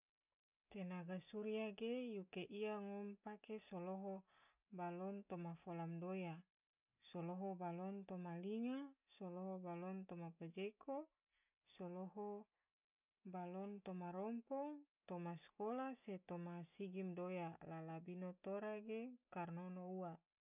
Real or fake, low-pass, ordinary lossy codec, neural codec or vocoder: real; 3.6 kHz; none; none